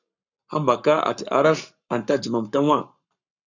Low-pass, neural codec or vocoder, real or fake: 7.2 kHz; codec, 44.1 kHz, 7.8 kbps, Pupu-Codec; fake